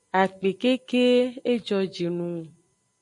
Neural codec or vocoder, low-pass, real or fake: none; 10.8 kHz; real